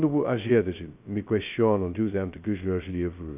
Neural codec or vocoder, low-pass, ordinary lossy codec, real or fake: codec, 16 kHz, 0.2 kbps, FocalCodec; 3.6 kHz; none; fake